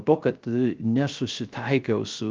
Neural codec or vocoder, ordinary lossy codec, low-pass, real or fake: codec, 16 kHz, 0.3 kbps, FocalCodec; Opus, 24 kbps; 7.2 kHz; fake